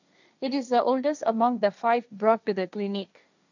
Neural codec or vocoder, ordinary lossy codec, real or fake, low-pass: codec, 16 kHz, 1.1 kbps, Voila-Tokenizer; none; fake; 7.2 kHz